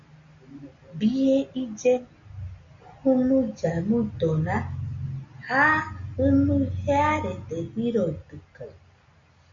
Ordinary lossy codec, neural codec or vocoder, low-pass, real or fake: MP3, 48 kbps; none; 7.2 kHz; real